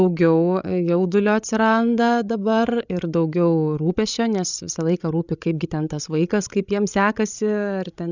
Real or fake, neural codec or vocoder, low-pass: fake; codec, 16 kHz, 16 kbps, FreqCodec, larger model; 7.2 kHz